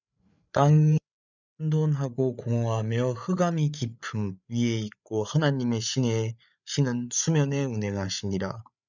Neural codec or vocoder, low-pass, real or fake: codec, 16 kHz, 8 kbps, FreqCodec, larger model; 7.2 kHz; fake